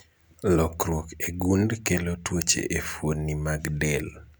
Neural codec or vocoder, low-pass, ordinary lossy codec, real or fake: none; none; none; real